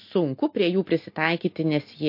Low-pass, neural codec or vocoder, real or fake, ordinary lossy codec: 5.4 kHz; none; real; MP3, 32 kbps